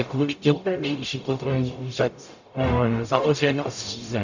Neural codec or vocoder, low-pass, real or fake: codec, 44.1 kHz, 0.9 kbps, DAC; 7.2 kHz; fake